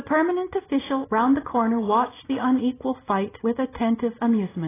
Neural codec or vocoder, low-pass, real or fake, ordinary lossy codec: none; 3.6 kHz; real; AAC, 16 kbps